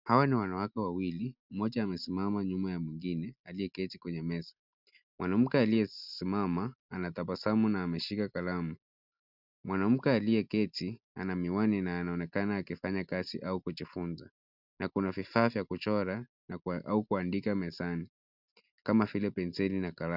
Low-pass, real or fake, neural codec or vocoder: 5.4 kHz; real; none